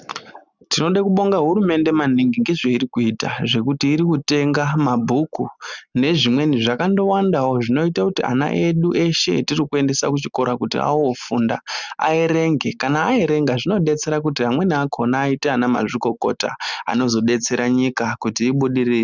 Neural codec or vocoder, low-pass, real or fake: none; 7.2 kHz; real